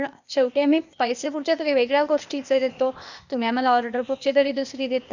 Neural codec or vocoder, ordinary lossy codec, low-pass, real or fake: codec, 16 kHz, 0.8 kbps, ZipCodec; none; 7.2 kHz; fake